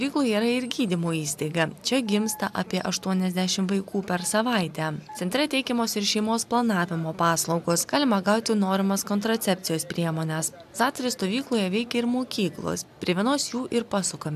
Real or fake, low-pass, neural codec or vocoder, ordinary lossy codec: real; 14.4 kHz; none; AAC, 96 kbps